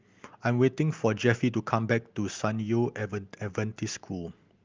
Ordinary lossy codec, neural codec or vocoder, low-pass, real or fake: Opus, 32 kbps; none; 7.2 kHz; real